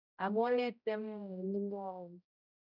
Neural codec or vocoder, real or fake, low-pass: codec, 16 kHz, 0.5 kbps, X-Codec, HuBERT features, trained on general audio; fake; 5.4 kHz